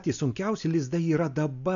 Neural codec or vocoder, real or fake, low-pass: none; real; 7.2 kHz